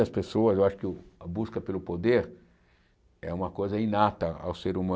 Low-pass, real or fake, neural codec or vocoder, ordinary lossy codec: none; real; none; none